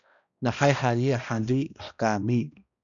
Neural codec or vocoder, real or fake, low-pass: codec, 16 kHz, 1 kbps, X-Codec, HuBERT features, trained on balanced general audio; fake; 7.2 kHz